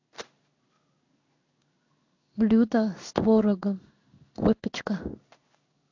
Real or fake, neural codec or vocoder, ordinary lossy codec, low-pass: fake; codec, 16 kHz in and 24 kHz out, 1 kbps, XY-Tokenizer; AAC, 48 kbps; 7.2 kHz